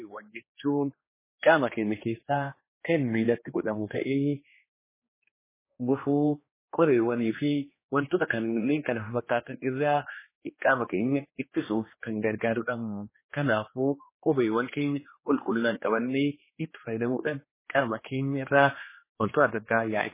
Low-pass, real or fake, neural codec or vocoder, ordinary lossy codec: 3.6 kHz; fake; codec, 16 kHz, 2 kbps, X-Codec, HuBERT features, trained on general audio; MP3, 16 kbps